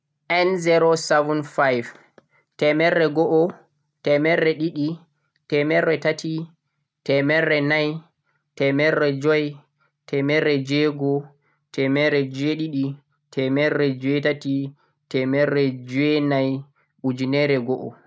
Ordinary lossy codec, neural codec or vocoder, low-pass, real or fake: none; none; none; real